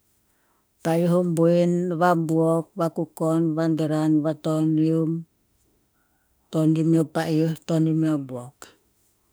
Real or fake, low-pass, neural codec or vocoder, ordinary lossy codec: fake; none; autoencoder, 48 kHz, 32 numbers a frame, DAC-VAE, trained on Japanese speech; none